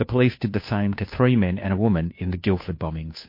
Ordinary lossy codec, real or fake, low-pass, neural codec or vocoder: MP3, 32 kbps; fake; 5.4 kHz; codec, 16 kHz, 2 kbps, FunCodec, trained on Chinese and English, 25 frames a second